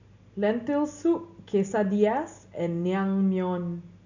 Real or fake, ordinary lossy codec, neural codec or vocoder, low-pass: real; none; none; 7.2 kHz